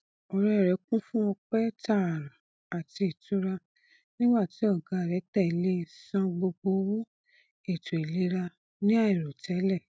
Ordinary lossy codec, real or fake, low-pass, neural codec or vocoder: none; real; none; none